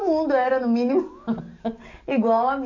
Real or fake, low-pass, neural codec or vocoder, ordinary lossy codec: fake; 7.2 kHz; codec, 44.1 kHz, 7.8 kbps, DAC; AAC, 48 kbps